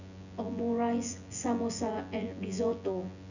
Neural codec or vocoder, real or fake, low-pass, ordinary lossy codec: vocoder, 24 kHz, 100 mel bands, Vocos; fake; 7.2 kHz; none